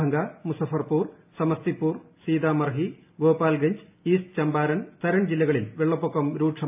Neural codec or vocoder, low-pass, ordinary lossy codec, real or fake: none; 3.6 kHz; AAC, 32 kbps; real